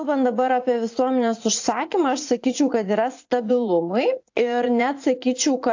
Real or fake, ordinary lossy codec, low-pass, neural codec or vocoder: fake; AAC, 48 kbps; 7.2 kHz; vocoder, 44.1 kHz, 80 mel bands, Vocos